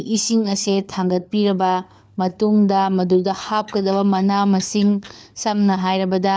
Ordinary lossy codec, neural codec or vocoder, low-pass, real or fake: none; codec, 16 kHz, 4 kbps, FunCodec, trained on LibriTTS, 50 frames a second; none; fake